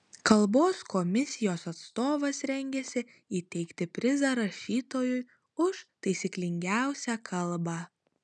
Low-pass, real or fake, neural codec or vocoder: 10.8 kHz; real; none